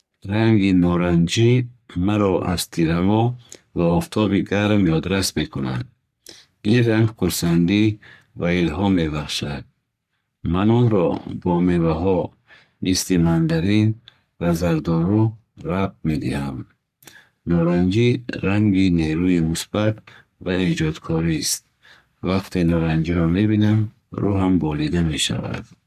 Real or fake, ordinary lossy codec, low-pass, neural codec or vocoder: fake; none; 14.4 kHz; codec, 44.1 kHz, 3.4 kbps, Pupu-Codec